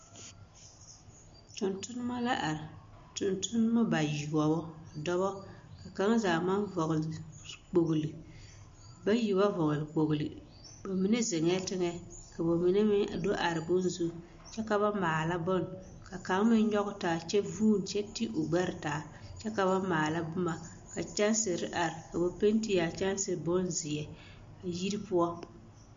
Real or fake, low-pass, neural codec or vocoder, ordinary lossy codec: real; 7.2 kHz; none; MP3, 48 kbps